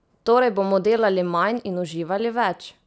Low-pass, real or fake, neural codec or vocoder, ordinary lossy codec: none; real; none; none